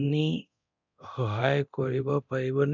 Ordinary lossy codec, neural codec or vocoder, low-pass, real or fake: none; codec, 24 kHz, 0.9 kbps, DualCodec; 7.2 kHz; fake